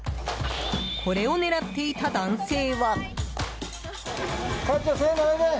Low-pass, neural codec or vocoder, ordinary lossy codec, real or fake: none; none; none; real